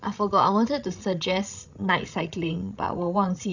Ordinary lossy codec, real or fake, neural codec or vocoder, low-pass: none; fake; codec, 16 kHz, 16 kbps, FreqCodec, larger model; 7.2 kHz